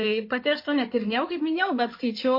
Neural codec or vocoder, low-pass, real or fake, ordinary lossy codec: codec, 16 kHz in and 24 kHz out, 2.2 kbps, FireRedTTS-2 codec; 5.4 kHz; fake; MP3, 32 kbps